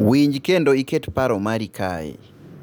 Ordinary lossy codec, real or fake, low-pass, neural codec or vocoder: none; real; none; none